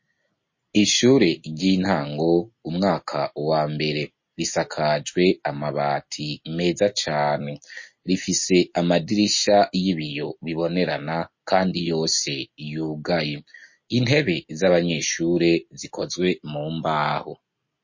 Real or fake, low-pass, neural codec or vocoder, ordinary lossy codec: real; 7.2 kHz; none; MP3, 32 kbps